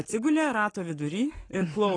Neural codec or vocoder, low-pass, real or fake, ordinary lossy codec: codec, 44.1 kHz, 7.8 kbps, Pupu-Codec; 9.9 kHz; fake; AAC, 32 kbps